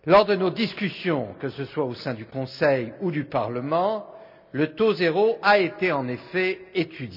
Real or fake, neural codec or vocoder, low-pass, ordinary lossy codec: real; none; 5.4 kHz; none